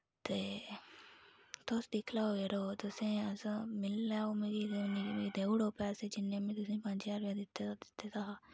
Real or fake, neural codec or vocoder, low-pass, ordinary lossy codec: real; none; none; none